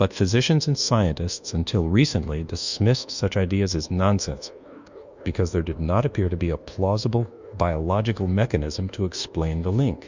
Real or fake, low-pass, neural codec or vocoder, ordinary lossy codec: fake; 7.2 kHz; codec, 24 kHz, 1.2 kbps, DualCodec; Opus, 64 kbps